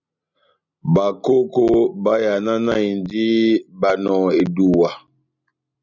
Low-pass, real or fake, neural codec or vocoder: 7.2 kHz; real; none